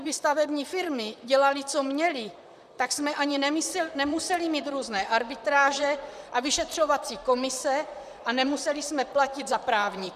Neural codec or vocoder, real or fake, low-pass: vocoder, 44.1 kHz, 128 mel bands, Pupu-Vocoder; fake; 14.4 kHz